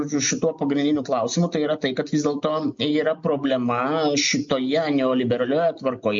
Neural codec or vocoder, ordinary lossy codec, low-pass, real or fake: none; MP3, 48 kbps; 7.2 kHz; real